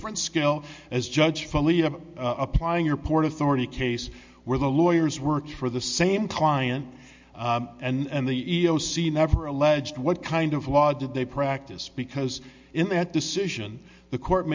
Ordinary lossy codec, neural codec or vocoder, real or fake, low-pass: MP3, 64 kbps; none; real; 7.2 kHz